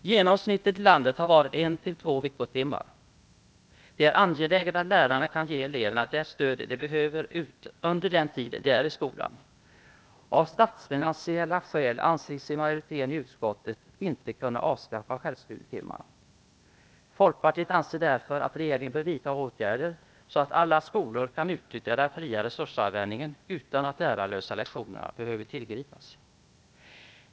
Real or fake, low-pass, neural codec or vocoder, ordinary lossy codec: fake; none; codec, 16 kHz, 0.8 kbps, ZipCodec; none